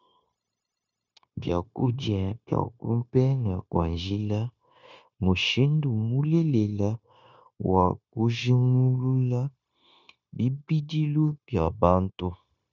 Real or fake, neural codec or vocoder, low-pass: fake; codec, 16 kHz, 0.9 kbps, LongCat-Audio-Codec; 7.2 kHz